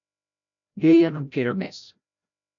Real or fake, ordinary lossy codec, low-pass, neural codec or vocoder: fake; MP3, 64 kbps; 7.2 kHz; codec, 16 kHz, 0.5 kbps, FreqCodec, larger model